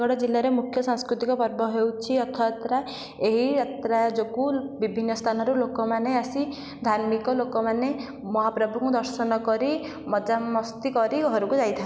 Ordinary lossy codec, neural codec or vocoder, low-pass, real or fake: none; none; none; real